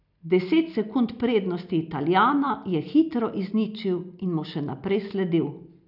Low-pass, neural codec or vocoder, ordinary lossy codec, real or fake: 5.4 kHz; none; none; real